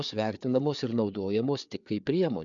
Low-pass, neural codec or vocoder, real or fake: 7.2 kHz; codec, 16 kHz, 4 kbps, FreqCodec, larger model; fake